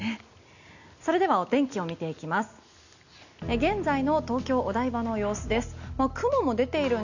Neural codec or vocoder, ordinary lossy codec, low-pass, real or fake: none; none; 7.2 kHz; real